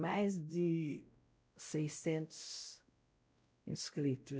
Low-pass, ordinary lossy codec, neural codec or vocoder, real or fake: none; none; codec, 16 kHz, 0.5 kbps, X-Codec, WavLM features, trained on Multilingual LibriSpeech; fake